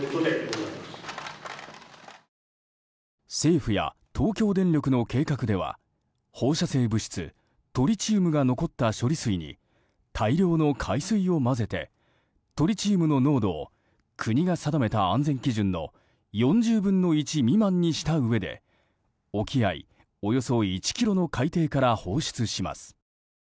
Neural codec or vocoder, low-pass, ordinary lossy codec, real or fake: none; none; none; real